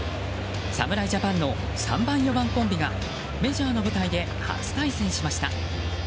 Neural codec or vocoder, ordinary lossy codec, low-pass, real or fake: none; none; none; real